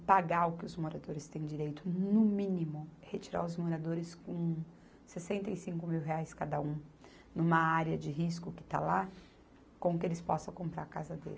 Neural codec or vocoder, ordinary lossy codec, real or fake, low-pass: none; none; real; none